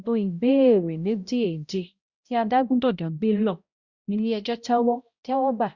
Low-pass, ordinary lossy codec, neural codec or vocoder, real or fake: 7.2 kHz; Opus, 64 kbps; codec, 16 kHz, 0.5 kbps, X-Codec, HuBERT features, trained on balanced general audio; fake